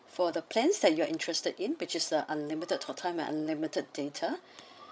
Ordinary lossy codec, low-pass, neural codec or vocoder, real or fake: none; none; codec, 16 kHz, 16 kbps, FreqCodec, larger model; fake